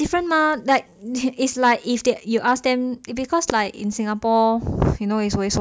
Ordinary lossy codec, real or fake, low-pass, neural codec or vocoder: none; real; none; none